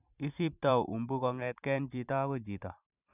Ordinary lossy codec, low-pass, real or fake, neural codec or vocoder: none; 3.6 kHz; fake; vocoder, 44.1 kHz, 128 mel bands every 512 samples, BigVGAN v2